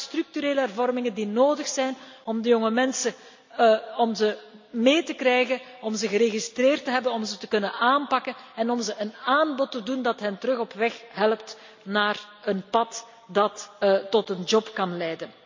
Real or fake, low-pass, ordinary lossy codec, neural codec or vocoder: real; 7.2 kHz; MP3, 64 kbps; none